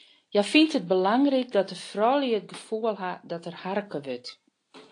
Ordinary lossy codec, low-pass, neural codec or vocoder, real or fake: AAC, 48 kbps; 9.9 kHz; none; real